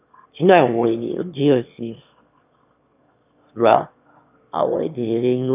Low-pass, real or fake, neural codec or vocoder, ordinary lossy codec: 3.6 kHz; fake; autoencoder, 22.05 kHz, a latent of 192 numbers a frame, VITS, trained on one speaker; none